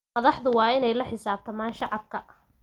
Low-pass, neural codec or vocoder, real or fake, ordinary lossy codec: 19.8 kHz; none; real; Opus, 32 kbps